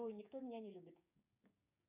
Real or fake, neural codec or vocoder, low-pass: fake; codec, 16 kHz, 6 kbps, DAC; 3.6 kHz